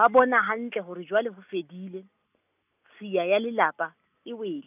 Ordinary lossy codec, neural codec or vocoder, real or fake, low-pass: none; none; real; 3.6 kHz